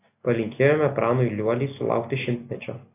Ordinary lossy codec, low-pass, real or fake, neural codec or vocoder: AAC, 24 kbps; 3.6 kHz; real; none